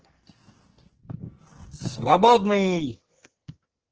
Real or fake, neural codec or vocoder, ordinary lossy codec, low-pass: fake; codec, 44.1 kHz, 2.6 kbps, SNAC; Opus, 16 kbps; 7.2 kHz